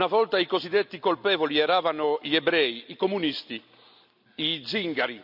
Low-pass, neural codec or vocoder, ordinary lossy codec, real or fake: 5.4 kHz; none; none; real